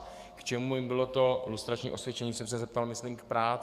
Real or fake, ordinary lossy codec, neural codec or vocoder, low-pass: fake; Opus, 64 kbps; codec, 44.1 kHz, 7.8 kbps, DAC; 14.4 kHz